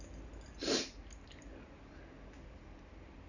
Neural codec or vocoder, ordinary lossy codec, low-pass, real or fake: none; none; 7.2 kHz; real